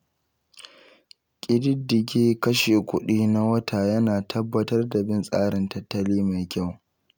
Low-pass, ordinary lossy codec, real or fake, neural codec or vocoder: none; none; real; none